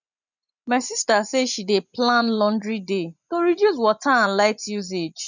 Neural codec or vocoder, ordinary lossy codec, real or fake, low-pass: vocoder, 24 kHz, 100 mel bands, Vocos; none; fake; 7.2 kHz